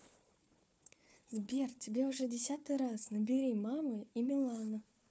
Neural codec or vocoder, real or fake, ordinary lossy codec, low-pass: codec, 16 kHz, 4 kbps, FunCodec, trained on Chinese and English, 50 frames a second; fake; none; none